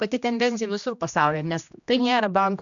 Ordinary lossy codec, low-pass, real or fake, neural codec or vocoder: MP3, 96 kbps; 7.2 kHz; fake; codec, 16 kHz, 1 kbps, X-Codec, HuBERT features, trained on general audio